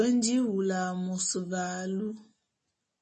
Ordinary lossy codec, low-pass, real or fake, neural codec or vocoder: MP3, 32 kbps; 10.8 kHz; real; none